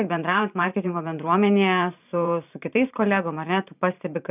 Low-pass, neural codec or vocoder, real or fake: 3.6 kHz; vocoder, 44.1 kHz, 128 mel bands every 512 samples, BigVGAN v2; fake